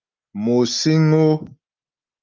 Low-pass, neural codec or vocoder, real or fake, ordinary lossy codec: 7.2 kHz; none; real; Opus, 32 kbps